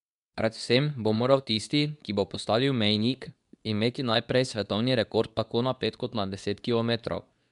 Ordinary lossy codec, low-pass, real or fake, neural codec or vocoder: none; 10.8 kHz; fake; codec, 24 kHz, 0.9 kbps, WavTokenizer, medium speech release version 2